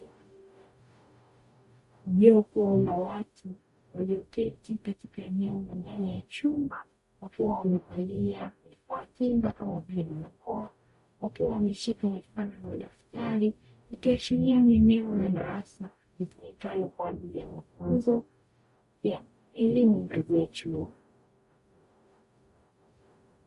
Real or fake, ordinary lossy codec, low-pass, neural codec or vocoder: fake; MP3, 48 kbps; 14.4 kHz; codec, 44.1 kHz, 0.9 kbps, DAC